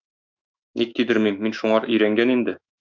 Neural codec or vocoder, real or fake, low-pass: autoencoder, 48 kHz, 128 numbers a frame, DAC-VAE, trained on Japanese speech; fake; 7.2 kHz